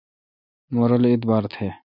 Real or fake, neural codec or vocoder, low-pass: real; none; 5.4 kHz